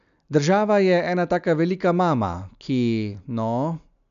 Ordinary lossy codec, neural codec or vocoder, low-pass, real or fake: none; none; 7.2 kHz; real